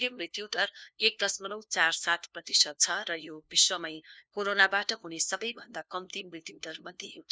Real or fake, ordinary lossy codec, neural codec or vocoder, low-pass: fake; none; codec, 16 kHz, 1 kbps, FunCodec, trained on LibriTTS, 50 frames a second; none